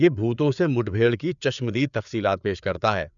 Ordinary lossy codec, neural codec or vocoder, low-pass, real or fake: none; codec, 16 kHz, 4 kbps, FreqCodec, larger model; 7.2 kHz; fake